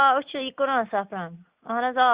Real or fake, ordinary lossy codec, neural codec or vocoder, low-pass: real; Opus, 64 kbps; none; 3.6 kHz